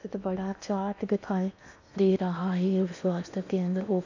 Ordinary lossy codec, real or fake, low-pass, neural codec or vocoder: none; fake; 7.2 kHz; codec, 16 kHz in and 24 kHz out, 0.8 kbps, FocalCodec, streaming, 65536 codes